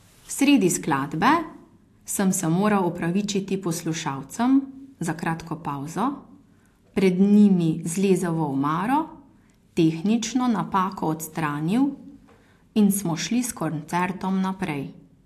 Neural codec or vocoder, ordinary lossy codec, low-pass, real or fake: none; AAC, 64 kbps; 14.4 kHz; real